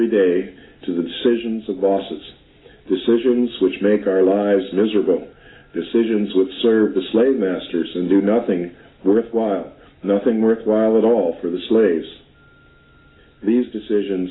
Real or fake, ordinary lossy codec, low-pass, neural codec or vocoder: real; AAC, 16 kbps; 7.2 kHz; none